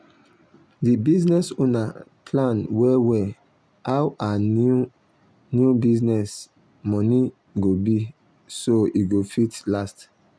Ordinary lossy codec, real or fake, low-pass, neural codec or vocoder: none; real; none; none